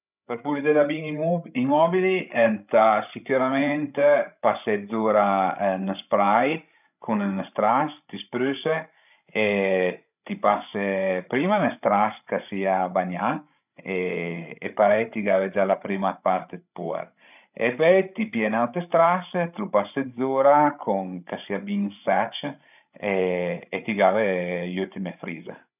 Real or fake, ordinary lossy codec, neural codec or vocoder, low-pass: fake; none; codec, 16 kHz, 16 kbps, FreqCodec, larger model; 3.6 kHz